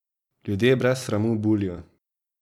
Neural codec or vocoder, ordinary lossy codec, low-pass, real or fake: vocoder, 44.1 kHz, 128 mel bands every 256 samples, BigVGAN v2; none; 19.8 kHz; fake